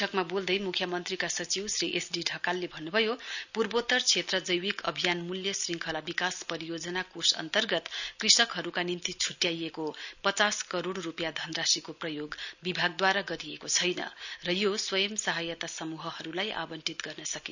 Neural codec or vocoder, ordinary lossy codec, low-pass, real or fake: none; none; 7.2 kHz; real